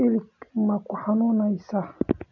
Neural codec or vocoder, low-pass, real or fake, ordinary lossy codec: none; 7.2 kHz; real; none